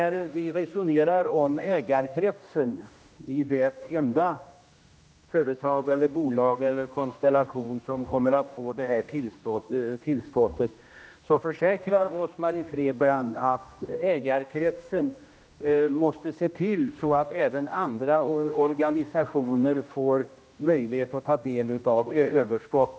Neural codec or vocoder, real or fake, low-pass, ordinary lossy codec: codec, 16 kHz, 1 kbps, X-Codec, HuBERT features, trained on general audio; fake; none; none